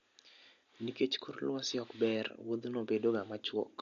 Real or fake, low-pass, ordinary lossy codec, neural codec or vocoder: real; 7.2 kHz; MP3, 48 kbps; none